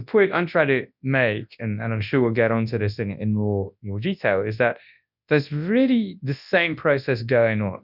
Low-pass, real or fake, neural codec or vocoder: 5.4 kHz; fake; codec, 24 kHz, 0.9 kbps, WavTokenizer, large speech release